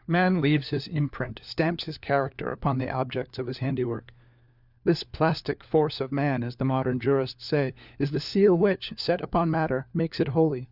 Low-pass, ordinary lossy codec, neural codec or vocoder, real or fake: 5.4 kHz; Opus, 64 kbps; codec, 16 kHz, 4 kbps, FunCodec, trained on LibriTTS, 50 frames a second; fake